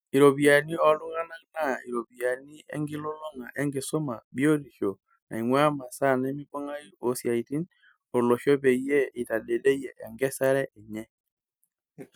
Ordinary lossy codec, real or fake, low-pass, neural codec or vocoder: none; real; none; none